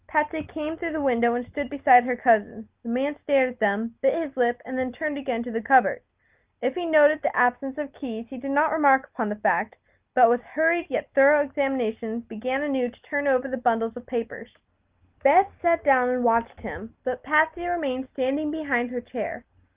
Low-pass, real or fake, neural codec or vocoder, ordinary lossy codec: 3.6 kHz; real; none; Opus, 32 kbps